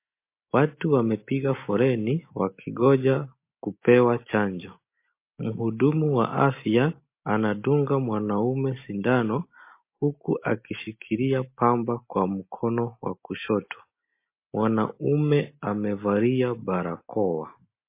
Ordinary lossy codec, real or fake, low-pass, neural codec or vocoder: MP3, 24 kbps; real; 3.6 kHz; none